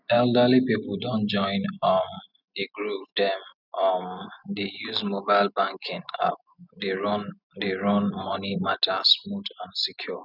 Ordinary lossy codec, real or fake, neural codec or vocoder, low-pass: none; real; none; 5.4 kHz